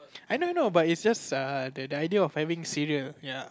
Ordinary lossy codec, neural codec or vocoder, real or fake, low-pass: none; none; real; none